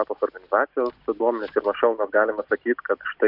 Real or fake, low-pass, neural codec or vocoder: real; 5.4 kHz; none